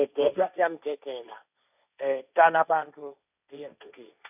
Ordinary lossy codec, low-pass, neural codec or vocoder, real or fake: none; 3.6 kHz; codec, 16 kHz, 1.1 kbps, Voila-Tokenizer; fake